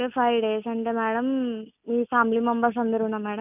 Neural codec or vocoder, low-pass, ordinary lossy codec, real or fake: none; 3.6 kHz; none; real